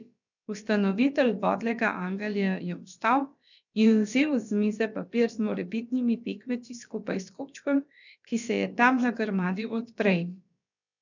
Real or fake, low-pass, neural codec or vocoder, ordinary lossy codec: fake; 7.2 kHz; codec, 16 kHz, about 1 kbps, DyCAST, with the encoder's durations; none